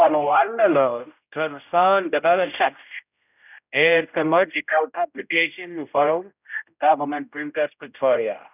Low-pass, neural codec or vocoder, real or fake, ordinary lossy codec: 3.6 kHz; codec, 16 kHz, 0.5 kbps, X-Codec, HuBERT features, trained on general audio; fake; none